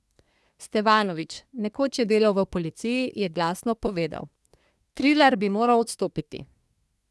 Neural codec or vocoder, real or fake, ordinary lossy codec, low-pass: codec, 24 kHz, 1 kbps, SNAC; fake; none; none